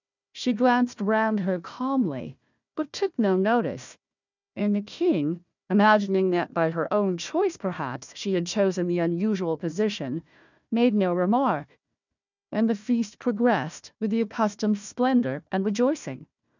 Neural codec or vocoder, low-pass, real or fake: codec, 16 kHz, 1 kbps, FunCodec, trained on Chinese and English, 50 frames a second; 7.2 kHz; fake